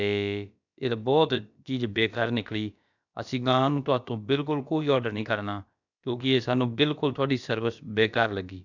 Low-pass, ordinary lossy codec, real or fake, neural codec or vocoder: 7.2 kHz; none; fake; codec, 16 kHz, about 1 kbps, DyCAST, with the encoder's durations